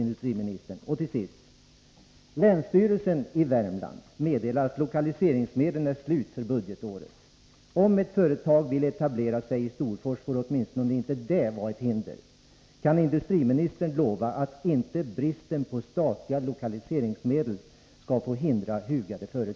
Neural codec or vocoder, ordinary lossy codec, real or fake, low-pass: none; none; real; none